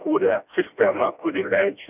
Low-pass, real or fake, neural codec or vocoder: 3.6 kHz; fake; codec, 16 kHz, 1 kbps, FreqCodec, smaller model